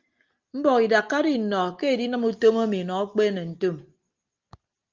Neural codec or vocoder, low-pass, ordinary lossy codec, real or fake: none; 7.2 kHz; Opus, 24 kbps; real